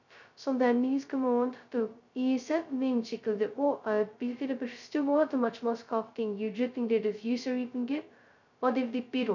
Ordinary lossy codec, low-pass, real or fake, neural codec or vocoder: none; 7.2 kHz; fake; codec, 16 kHz, 0.2 kbps, FocalCodec